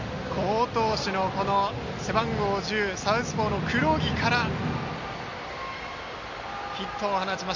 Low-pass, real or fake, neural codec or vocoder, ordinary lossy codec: 7.2 kHz; real; none; MP3, 64 kbps